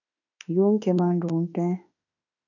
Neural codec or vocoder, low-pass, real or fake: autoencoder, 48 kHz, 32 numbers a frame, DAC-VAE, trained on Japanese speech; 7.2 kHz; fake